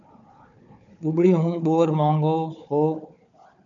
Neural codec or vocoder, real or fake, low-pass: codec, 16 kHz, 4 kbps, FunCodec, trained on Chinese and English, 50 frames a second; fake; 7.2 kHz